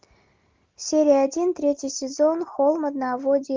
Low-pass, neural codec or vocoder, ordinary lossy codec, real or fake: 7.2 kHz; none; Opus, 32 kbps; real